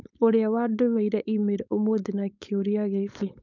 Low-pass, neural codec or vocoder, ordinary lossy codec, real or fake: 7.2 kHz; codec, 16 kHz, 4.8 kbps, FACodec; none; fake